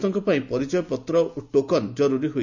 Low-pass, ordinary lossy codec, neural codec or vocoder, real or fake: 7.2 kHz; none; none; real